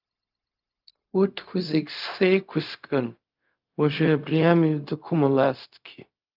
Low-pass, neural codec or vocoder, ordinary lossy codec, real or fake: 5.4 kHz; codec, 16 kHz, 0.4 kbps, LongCat-Audio-Codec; Opus, 24 kbps; fake